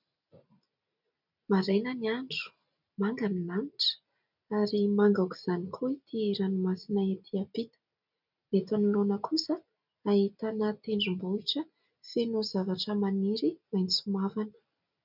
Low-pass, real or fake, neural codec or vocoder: 5.4 kHz; real; none